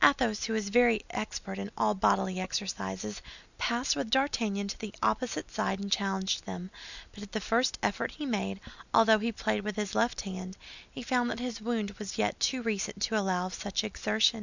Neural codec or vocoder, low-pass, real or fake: none; 7.2 kHz; real